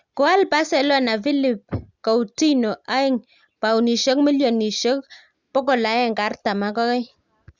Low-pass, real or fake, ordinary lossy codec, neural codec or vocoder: 7.2 kHz; real; Opus, 64 kbps; none